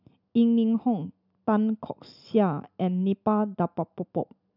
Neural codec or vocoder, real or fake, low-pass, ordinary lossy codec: none; real; 5.4 kHz; none